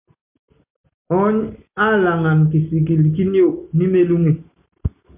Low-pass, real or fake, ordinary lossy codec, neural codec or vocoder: 3.6 kHz; real; MP3, 32 kbps; none